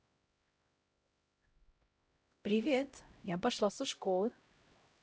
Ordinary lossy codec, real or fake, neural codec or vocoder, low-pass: none; fake; codec, 16 kHz, 0.5 kbps, X-Codec, HuBERT features, trained on LibriSpeech; none